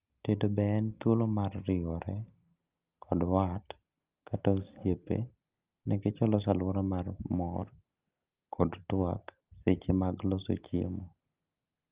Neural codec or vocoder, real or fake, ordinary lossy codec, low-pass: none; real; Opus, 32 kbps; 3.6 kHz